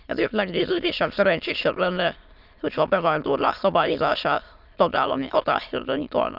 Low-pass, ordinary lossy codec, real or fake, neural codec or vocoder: 5.4 kHz; none; fake; autoencoder, 22.05 kHz, a latent of 192 numbers a frame, VITS, trained on many speakers